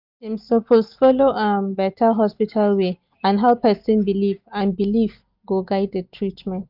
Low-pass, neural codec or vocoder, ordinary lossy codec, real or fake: 5.4 kHz; none; none; real